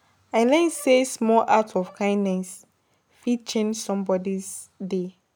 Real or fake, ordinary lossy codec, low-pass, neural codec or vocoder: real; none; none; none